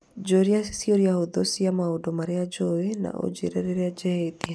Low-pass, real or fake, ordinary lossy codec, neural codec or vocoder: none; real; none; none